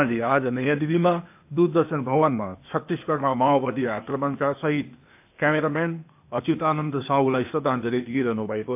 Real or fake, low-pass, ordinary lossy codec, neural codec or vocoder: fake; 3.6 kHz; AAC, 32 kbps; codec, 16 kHz, 0.8 kbps, ZipCodec